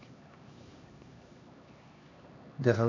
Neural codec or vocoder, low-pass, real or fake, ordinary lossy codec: codec, 16 kHz, 2 kbps, X-Codec, HuBERT features, trained on LibriSpeech; 7.2 kHz; fake; MP3, 64 kbps